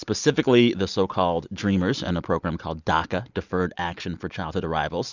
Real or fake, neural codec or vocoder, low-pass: real; none; 7.2 kHz